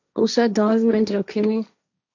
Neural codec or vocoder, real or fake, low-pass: codec, 16 kHz, 1.1 kbps, Voila-Tokenizer; fake; 7.2 kHz